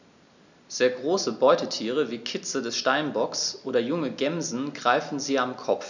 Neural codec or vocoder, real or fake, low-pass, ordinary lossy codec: none; real; 7.2 kHz; none